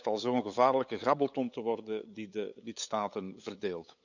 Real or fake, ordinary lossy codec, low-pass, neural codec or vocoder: fake; none; 7.2 kHz; codec, 16 kHz, 8 kbps, FunCodec, trained on LibriTTS, 25 frames a second